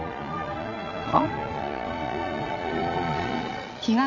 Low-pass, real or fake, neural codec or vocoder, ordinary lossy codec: 7.2 kHz; fake; vocoder, 22.05 kHz, 80 mel bands, Vocos; none